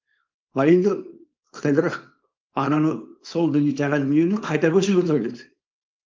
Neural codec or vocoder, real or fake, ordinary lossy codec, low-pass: codec, 24 kHz, 0.9 kbps, WavTokenizer, small release; fake; Opus, 32 kbps; 7.2 kHz